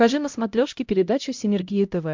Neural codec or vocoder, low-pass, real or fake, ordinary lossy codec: codec, 16 kHz, 0.5 kbps, X-Codec, HuBERT features, trained on LibriSpeech; 7.2 kHz; fake; MP3, 64 kbps